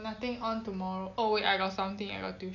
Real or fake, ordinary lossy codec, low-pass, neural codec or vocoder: real; none; 7.2 kHz; none